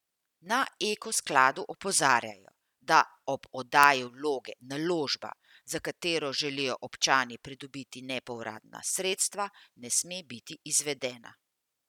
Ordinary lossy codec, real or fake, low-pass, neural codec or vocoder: none; real; 19.8 kHz; none